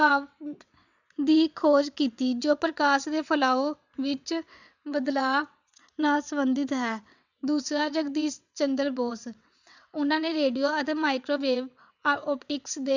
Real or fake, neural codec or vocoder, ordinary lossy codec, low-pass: fake; vocoder, 22.05 kHz, 80 mel bands, WaveNeXt; none; 7.2 kHz